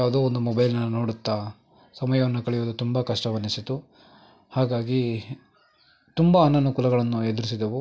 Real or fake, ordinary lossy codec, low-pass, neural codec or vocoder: real; none; none; none